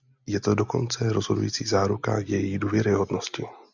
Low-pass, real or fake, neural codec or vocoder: 7.2 kHz; real; none